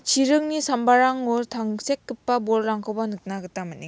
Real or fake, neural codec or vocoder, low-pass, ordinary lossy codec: real; none; none; none